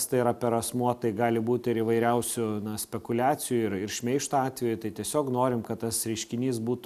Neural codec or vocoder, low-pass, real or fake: none; 14.4 kHz; real